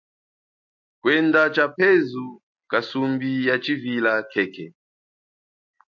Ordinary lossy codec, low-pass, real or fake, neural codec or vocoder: MP3, 48 kbps; 7.2 kHz; real; none